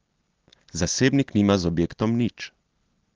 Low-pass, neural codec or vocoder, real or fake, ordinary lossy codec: 7.2 kHz; none; real; Opus, 16 kbps